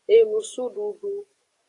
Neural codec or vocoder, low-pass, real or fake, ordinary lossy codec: codec, 44.1 kHz, 7.8 kbps, DAC; 10.8 kHz; fake; AAC, 48 kbps